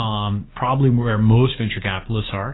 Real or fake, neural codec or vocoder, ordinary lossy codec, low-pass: fake; codec, 16 kHz, 6 kbps, DAC; AAC, 16 kbps; 7.2 kHz